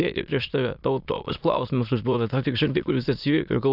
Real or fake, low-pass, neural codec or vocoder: fake; 5.4 kHz; autoencoder, 22.05 kHz, a latent of 192 numbers a frame, VITS, trained on many speakers